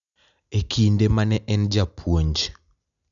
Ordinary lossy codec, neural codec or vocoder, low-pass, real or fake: none; none; 7.2 kHz; real